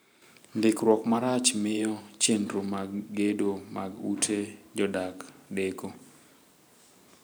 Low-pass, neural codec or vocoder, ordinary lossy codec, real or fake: none; vocoder, 44.1 kHz, 128 mel bands every 512 samples, BigVGAN v2; none; fake